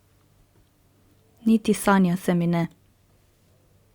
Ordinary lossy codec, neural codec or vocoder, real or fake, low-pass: Opus, 64 kbps; none; real; 19.8 kHz